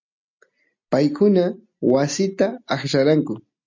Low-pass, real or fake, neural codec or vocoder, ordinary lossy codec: 7.2 kHz; real; none; MP3, 64 kbps